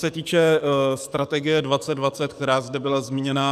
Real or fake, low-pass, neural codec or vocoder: fake; 14.4 kHz; codec, 44.1 kHz, 7.8 kbps, DAC